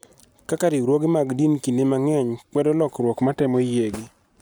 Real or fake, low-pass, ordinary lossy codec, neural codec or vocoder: real; none; none; none